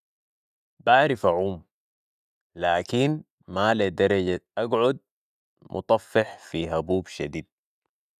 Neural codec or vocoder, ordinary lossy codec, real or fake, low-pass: none; none; real; 14.4 kHz